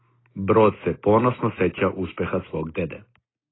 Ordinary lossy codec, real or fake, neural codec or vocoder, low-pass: AAC, 16 kbps; real; none; 7.2 kHz